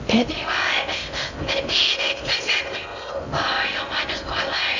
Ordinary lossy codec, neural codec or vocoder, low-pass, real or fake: none; codec, 16 kHz in and 24 kHz out, 0.6 kbps, FocalCodec, streaming, 2048 codes; 7.2 kHz; fake